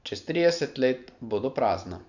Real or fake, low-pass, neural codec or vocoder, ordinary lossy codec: real; 7.2 kHz; none; none